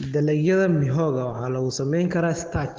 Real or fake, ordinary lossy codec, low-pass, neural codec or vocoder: real; Opus, 16 kbps; 7.2 kHz; none